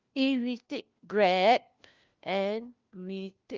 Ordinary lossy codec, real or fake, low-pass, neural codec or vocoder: Opus, 32 kbps; fake; 7.2 kHz; codec, 16 kHz, 1 kbps, FunCodec, trained on LibriTTS, 50 frames a second